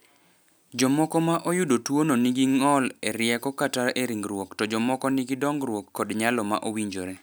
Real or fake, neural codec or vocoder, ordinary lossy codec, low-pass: real; none; none; none